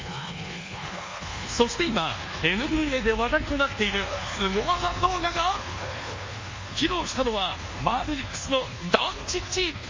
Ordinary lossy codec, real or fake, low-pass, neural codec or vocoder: MP3, 32 kbps; fake; 7.2 kHz; codec, 24 kHz, 1.2 kbps, DualCodec